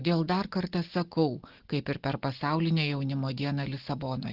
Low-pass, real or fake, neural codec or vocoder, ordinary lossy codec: 5.4 kHz; real; none; Opus, 16 kbps